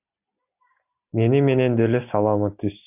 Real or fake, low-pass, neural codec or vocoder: real; 3.6 kHz; none